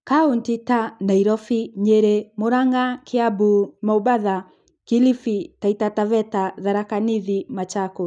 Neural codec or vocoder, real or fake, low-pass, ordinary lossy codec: none; real; 9.9 kHz; none